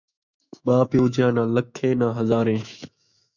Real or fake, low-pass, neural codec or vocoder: fake; 7.2 kHz; autoencoder, 48 kHz, 128 numbers a frame, DAC-VAE, trained on Japanese speech